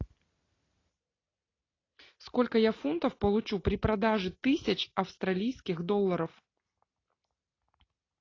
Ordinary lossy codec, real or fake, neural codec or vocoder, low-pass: AAC, 32 kbps; real; none; 7.2 kHz